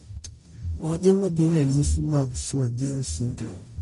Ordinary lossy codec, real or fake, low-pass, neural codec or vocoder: MP3, 48 kbps; fake; 14.4 kHz; codec, 44.1 kHz, 0.9 kbps, DAC